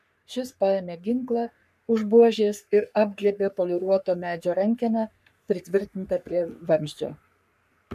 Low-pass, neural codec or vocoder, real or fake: 14.4 kHz; codec, 44.1 kHz, 3.4 kbps, Pupu-Codec; fake